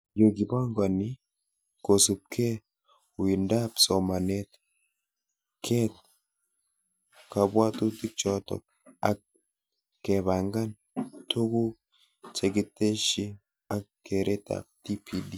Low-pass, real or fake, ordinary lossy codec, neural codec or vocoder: none; real; none; none